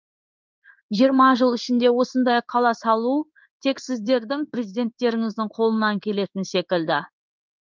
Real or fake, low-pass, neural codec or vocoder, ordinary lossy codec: fake; 7.2 kHz; codec, 16 kHz in and 24 kHz out, 1 kbps, XY-Tokenizer; Opus, 32 kbps